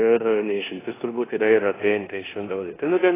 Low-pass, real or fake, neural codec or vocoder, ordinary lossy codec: 3.6 kHz; fake; codec, 16 kHz in and 24 kHz out, 0.9 kbps, LongCat-Audio-Codec, four codebook decoder; AAC, 16 kbps